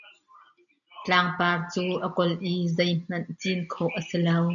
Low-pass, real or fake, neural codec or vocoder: 7.2 kHz; real; none